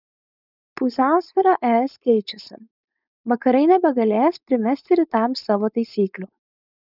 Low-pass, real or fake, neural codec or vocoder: 5.4 kHz; fake; codec, 16 kHz, 4.8 kbps, FACodec